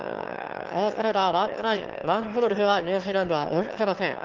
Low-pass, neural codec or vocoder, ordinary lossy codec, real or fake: 7.2 kHz; autoencoder, 22.05 kHz, a latent of 192 numbers a frame, VITS, trained on one speaker; Opus, 16 kbps; fake